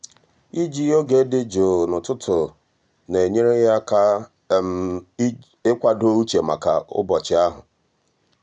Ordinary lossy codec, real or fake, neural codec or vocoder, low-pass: none; real; none; 9.9 kHz